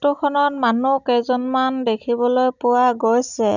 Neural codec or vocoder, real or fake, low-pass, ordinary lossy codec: none; real; 7.2 kHz; none